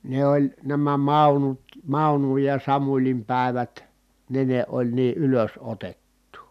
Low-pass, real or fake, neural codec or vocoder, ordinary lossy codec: 14.4 kHz; real; none; none